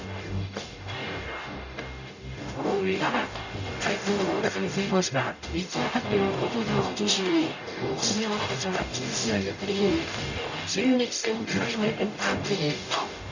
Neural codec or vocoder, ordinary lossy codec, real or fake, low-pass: codec, 44.1 kHz, 0.9 kbps, DAC; none; fake; 7.2 kHz